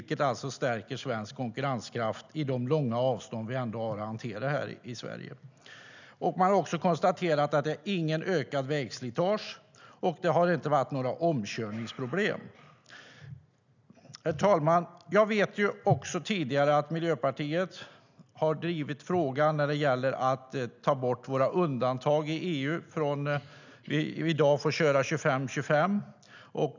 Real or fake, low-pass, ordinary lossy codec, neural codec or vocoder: real; 7.2 kHz; none; none